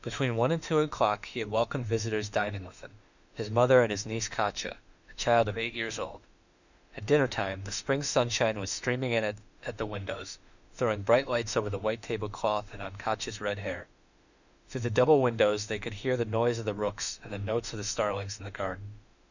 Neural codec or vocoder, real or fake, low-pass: autoencoder, 48 kHz, 32 numbers a frame, DAC-VAE, trained on Japanese speech; fake; 7.2 kHz